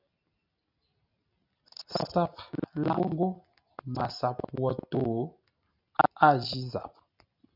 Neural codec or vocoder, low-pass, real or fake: none; 5.4 kHz; real